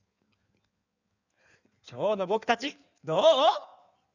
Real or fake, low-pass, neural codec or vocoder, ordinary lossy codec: fake; 7.2 kHz; codec, 16 kHz in and 24 kHz out, 1.1 kbps, FireRedTTS-2 codec; none